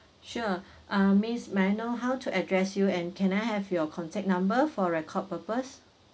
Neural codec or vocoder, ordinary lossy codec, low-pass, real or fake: none; none; none; real